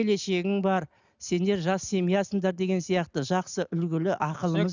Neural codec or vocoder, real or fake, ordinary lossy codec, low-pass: none; real; none; 7.2 kHz